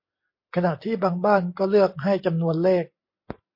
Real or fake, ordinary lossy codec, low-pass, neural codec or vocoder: real; MP3, 32 kbps; 5.4 kHz; none